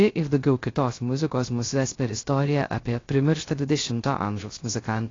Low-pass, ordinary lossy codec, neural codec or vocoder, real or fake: 7.2 kHz; AAC, 32 kbps; codec, 16 kHz, 0.3 kbps, FocalCodec; fake